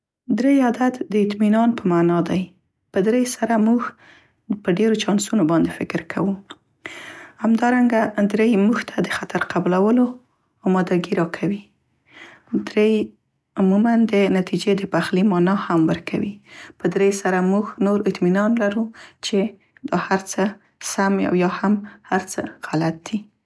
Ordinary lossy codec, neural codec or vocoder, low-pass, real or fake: none; none; none; real